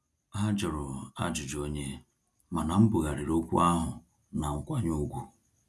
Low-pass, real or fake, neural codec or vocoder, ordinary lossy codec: none; real; none; none